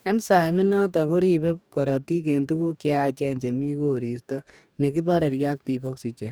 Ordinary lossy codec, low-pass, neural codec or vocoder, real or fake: none; none; codec, 44.1 kHz, 2.6 kbps, DAC; fake